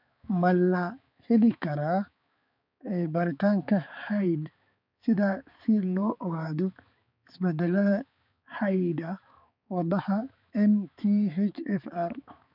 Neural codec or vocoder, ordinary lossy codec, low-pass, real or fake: codec, 16 kHz, 4 kbps, X-Codec, HuBERT features, trained on balanced general audio; none; 5.4 kHz; fake